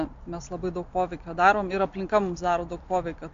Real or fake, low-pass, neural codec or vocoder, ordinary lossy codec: real; 7.2 kHz; none; AAC, 48 kbps